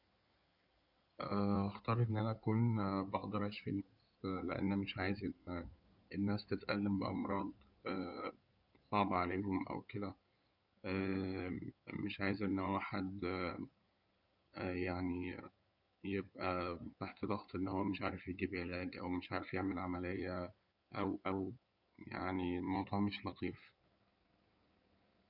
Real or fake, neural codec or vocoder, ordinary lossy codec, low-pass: fake; codec, 16 kHz in and 24 kHz out, 2.2 kbps, FireRedTTS-2 codec; none; 5.4 kHz